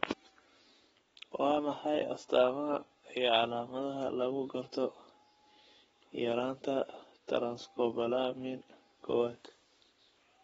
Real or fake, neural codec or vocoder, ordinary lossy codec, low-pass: fake; codec, 44.1 kHz, 7.8 kbps, Pupu-Codec; AAC, 24 kbps; 19.8 kHz